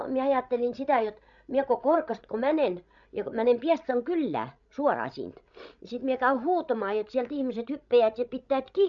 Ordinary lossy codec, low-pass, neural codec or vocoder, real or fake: none; 7.2 kHz; codec, 16 kHz, 16 kbps, FreqCodec, larger model; fake